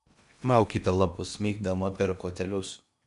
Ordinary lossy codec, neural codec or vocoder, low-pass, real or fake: MP3, 96 kbps; codec, 16 kHz in and 24 kHz out, 0.8 kbps, FocalCodec, streaming, 65536 codes; 10.8 kHz; fake